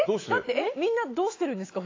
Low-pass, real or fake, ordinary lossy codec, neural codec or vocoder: 7.2 kHz; fake; AAC, 32 kbps; autoencoder, 48 kHz, 128 numbers a frame, DAC-VAE, trained on Japanese speech